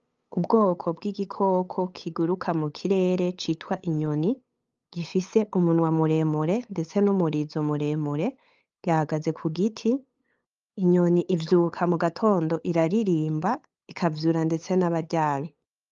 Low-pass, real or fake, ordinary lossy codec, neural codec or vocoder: 7.2 kHz; fake; Opus, 32 kbps; codec, 16 kHz, 8 kbps, FunCodec, trained on LibriTTS, 25 frames a second